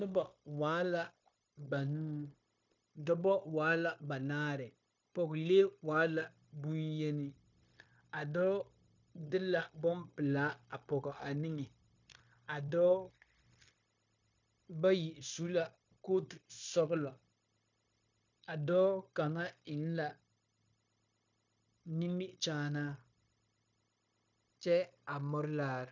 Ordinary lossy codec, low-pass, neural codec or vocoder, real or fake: MP3, 64 kbps; 7.2 kHz; codec, 16 kHz, 0.9 kbps, LongCat-Audio-Codec; fake